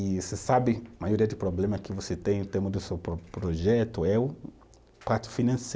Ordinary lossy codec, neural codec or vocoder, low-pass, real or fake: none; none; none; real